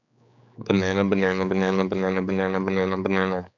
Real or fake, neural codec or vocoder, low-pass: fake; codec, 16 kHz, 4 kbps, X-Codec, HuBERT features, trained on general audio; 7.2 kHz